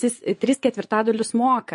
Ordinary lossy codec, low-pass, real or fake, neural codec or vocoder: MP3, 48 kbps; 10.8 kHz; fake; vocoder, 24 kHz, 100 mel bands, Vocos